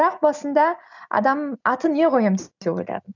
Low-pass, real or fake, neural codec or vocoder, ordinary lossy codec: 7.2 kHz; real; none; none